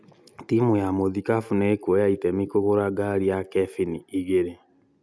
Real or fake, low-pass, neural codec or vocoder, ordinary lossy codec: real; none; none; none